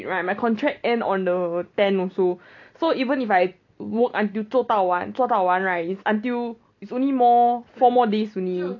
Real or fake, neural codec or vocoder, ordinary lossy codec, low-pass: real; none; none; 7.2 kHz